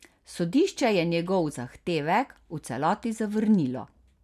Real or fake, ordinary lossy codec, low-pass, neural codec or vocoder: real; none; 14.4 kHz; none